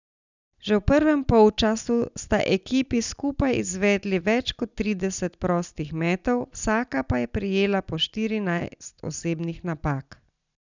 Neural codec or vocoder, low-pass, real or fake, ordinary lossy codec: none; 7.2 kHz; real; none